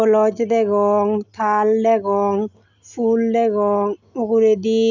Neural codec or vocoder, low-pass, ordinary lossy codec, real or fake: none; 7.2 kHz; none; real